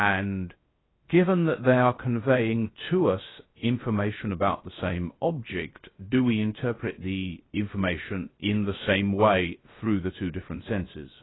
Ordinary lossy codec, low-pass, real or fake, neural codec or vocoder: AAC, 16 kbps; 7.2 kHz; fake; codec, 16 kHz, 0.3 kbps, FocalCodec